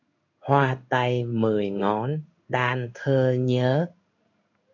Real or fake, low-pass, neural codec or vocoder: fake; 7.2 kHz; codec, 16 kHz in and 24 kHz out, 1 kbps, XY-Tokenizer